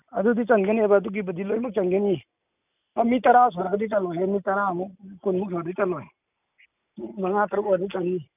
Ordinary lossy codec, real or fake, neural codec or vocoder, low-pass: none; real; none; 3.6 kHz